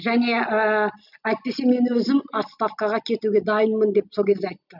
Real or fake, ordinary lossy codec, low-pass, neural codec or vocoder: real; none; 5.4 kHz; none